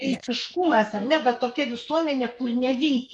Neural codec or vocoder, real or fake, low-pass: codec, 32 kHz, 1.9 kbps, SNAC; fake; 10.8 kHz